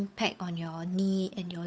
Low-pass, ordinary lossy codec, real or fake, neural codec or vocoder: none; none; fake; codec, 16 kHz, 8 kbps, FunCodec, trained on Chinese and English, 25 frames a second